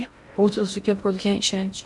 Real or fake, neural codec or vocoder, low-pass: fake; codec, 16 kHz in and 24 kHz out, 0.6 kbps, FocalCodec, streaming, 2048 codes; 10.8 kHz